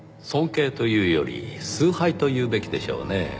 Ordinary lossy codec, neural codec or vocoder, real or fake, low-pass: none; none; real; none